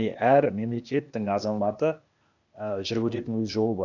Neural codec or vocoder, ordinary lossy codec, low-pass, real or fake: codec, 16 kHz, 0.8 kbps, ZipCodec; none; 7.2 kHz; fake